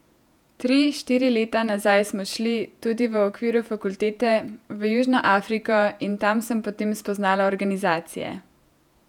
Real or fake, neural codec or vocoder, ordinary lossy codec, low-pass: fake; vocoder, 48 kHz, 128 mel bands, Vocos; none; 19.8 kHz